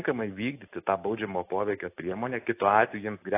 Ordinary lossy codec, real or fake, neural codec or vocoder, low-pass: AAC, 24 kbps; real; none; 3.6 kHz